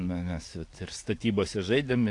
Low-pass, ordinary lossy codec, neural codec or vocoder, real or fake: 10.8 kHz; AAC, 48 kbps; none; real